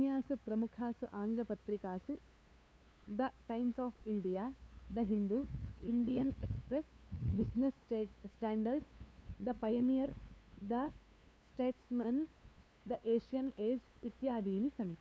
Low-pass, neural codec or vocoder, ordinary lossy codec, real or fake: none; codec, 16 kHz, 2 kbps, FunCodec, trained on LibriTTS, 25 frames a second; none; fake